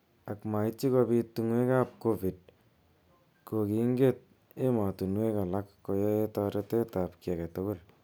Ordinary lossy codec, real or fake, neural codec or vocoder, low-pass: none; real; none; none